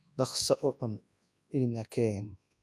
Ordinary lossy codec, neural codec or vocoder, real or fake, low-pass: none; codec, 24 kHz, 0.9 kbps, WavTokenizer, large speech release; fake; none